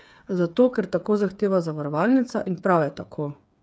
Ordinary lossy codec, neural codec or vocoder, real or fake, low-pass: none; codec, 16 kHz, 8 kbps, FreqCodec, smaller model; fake; none